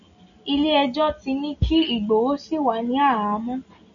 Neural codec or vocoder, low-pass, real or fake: none; 7.2 kHz; real